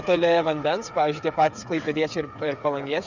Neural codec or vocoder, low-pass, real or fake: codec, 16 kHz, 8 kbps, FreqCodec, smaller model; 7.2 kHz; fake